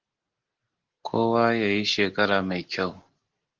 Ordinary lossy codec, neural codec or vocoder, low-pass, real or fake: Opus, 16 kbps; none; 7.2 kHz; real